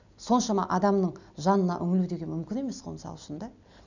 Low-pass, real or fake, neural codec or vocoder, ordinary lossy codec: 7.2 kHz; real; none; none